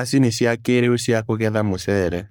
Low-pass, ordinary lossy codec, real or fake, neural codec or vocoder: none; none; fake; codec, 44.1 kHz, 3.4 kbps, Pupu-Codec